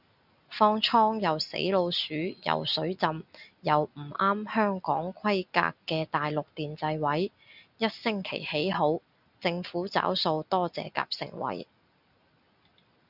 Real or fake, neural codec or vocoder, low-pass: real; none; 5.4 kHz